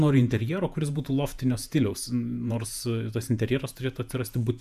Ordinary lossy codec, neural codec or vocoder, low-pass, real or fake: MP3, 96 kbps; vocoder, 48 kHz, 128 mel bands, Vocos; 14.4 kHz; fake